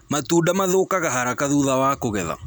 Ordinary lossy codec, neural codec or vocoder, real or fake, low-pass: none; none; real; none